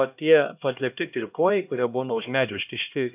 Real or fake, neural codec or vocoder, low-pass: fake; codec, 16 kHz, 1 kbps, X-Codec, HuBERT features, trained on LibriSpeech; 3.6 kHz